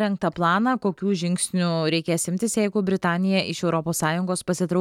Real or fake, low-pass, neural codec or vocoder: real; 19.8 kHz; none